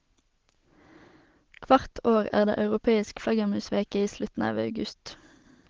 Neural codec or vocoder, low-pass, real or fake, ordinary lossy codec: none; 7.2 kHz; real; Opus, 16 kbps